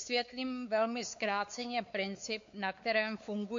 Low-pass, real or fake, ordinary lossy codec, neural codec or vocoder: 7.2 kHz; fake; MP3, 48 kbps; codec, 16 kHz, 4 kbps, X-Codec, WavLM features, trained on Multilingual LibriSpeech